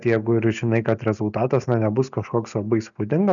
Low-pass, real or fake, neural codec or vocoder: 7.2 kHz; real; none